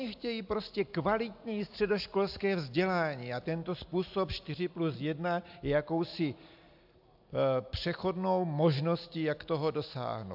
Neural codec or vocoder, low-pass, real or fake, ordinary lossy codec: none; 5.4 kHz; real; AAC, 48 kbps